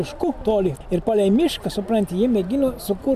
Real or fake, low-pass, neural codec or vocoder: real; 14.4 kHz; none